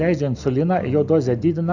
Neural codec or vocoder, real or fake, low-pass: none; real; 7.2 kHz